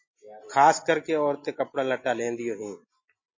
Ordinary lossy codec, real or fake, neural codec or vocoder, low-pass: MP3, 32 kbps; real; none; 7.2 kHz